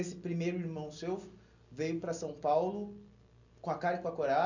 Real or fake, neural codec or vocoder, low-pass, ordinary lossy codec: real; none; 7.2 kHz; none